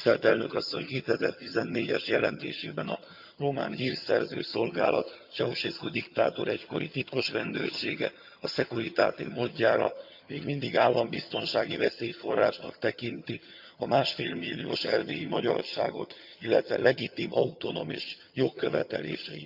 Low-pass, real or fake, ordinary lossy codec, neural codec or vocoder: 5.4 kHz; fake; Opus, 64 kbps; vocoder, 22.05 kHz, 80 mel bands, HiFi-GAN